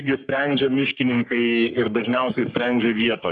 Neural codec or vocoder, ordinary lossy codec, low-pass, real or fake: codec, 44.1 kHz, 3.4 kbps, Pupu-Codec; MP3, 96 kbps; 10.8 kHz; fake